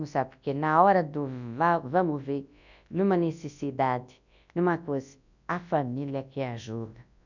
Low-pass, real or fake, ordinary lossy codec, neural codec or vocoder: 7.2 kHz; fake; none; codec, 24 kHz, 0.9 kbps, WavTokenizer, large speech release